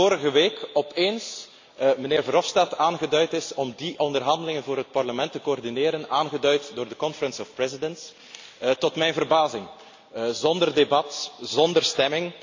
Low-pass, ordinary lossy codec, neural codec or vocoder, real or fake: 7.2 kHz; AAC, 48 kbps; none; real